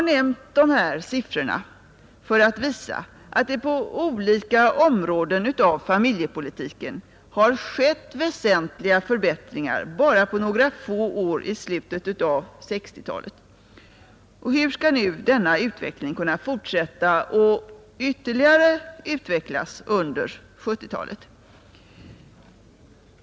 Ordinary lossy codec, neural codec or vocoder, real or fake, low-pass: none; none; real; none